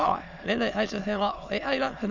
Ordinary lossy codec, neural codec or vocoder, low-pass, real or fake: none; autoencoder, 22.05 kHz, a latent of 192 numbers a frame, VITS, trained on many speakers; 7.2 kHz; fake